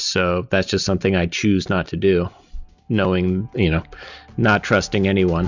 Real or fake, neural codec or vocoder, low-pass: real; none; 7.2 kHz